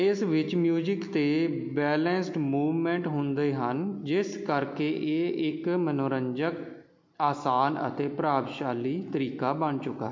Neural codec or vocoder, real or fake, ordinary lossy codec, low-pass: none; real; MP3, 48 kbps; 7.2 kHz